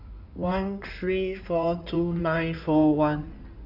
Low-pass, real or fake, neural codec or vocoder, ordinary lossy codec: 5.4 kHz; fake; codec, 16 kHz in and 24 kHz out, 2.2 kbps, FireRedTTS-2 codec; none